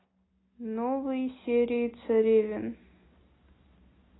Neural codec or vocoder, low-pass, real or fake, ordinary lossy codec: none; 7.2 kHz; real; AAC, 16 kbps